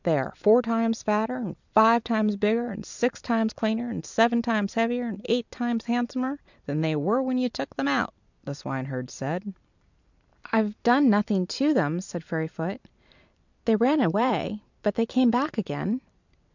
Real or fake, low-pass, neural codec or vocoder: fake; 7.2 kHz; vocoder, 44.1 kHz, 128 mel bands every 256 samples, BigVGAN v2